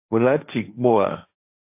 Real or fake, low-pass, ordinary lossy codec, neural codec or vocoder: fake; 3.6 kHz; AAC, 32 kbps; codec, 16 kHz, 1.1 kbps, Voila-Tokenizer